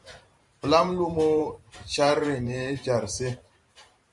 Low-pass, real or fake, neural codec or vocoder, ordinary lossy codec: 10.8 kHz; fake; vocoder, 44.1 kHz, 128 mel bands every 512 samples, BigVGAN v2; Opus, 64 kbps